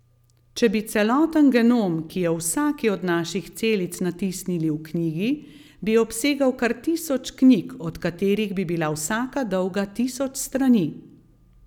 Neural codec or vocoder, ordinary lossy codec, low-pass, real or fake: none; none; 19.8 kHz; real